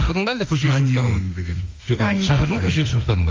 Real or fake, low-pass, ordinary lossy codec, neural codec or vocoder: fake; 7.2 kHz; Opus, 32 kbps; autoencoder, 48 kHz, 32 numbers a frame, DAC-VAE, trained on Japanese speech